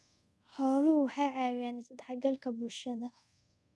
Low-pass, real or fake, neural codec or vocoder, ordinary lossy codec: none; fake; codec, 24 kHz, 0.5 kbps, DualCodec; none